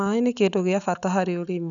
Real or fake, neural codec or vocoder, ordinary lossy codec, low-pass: real; none; none; 7.2 kHz